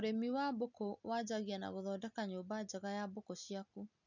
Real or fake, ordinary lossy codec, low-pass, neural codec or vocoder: real; none; 7.2 kHz; none